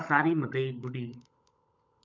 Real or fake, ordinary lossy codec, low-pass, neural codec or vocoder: fake; MP3, 64 kbps; 7.2 kHz; codec, 16 kHz, 16 kbps, FunCodec, trained on LibriTTS, 50 frames a second